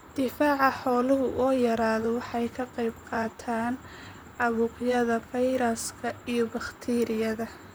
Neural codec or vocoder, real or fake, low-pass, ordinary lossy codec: vocoder, 44.1 kHz, 128 mel bands, Pupu-Vocoder; fake; none; none